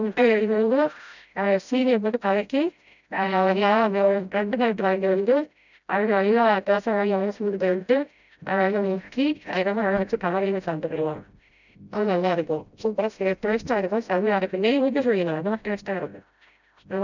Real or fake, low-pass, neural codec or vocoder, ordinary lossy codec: fake; 7.2 kHz; codec, 16 kHz, 0.5 kbps, FreqCodec, smaller model; none